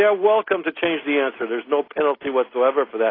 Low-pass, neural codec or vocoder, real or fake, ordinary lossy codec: 5.4 kHz; none; real; AAC, 24 kbps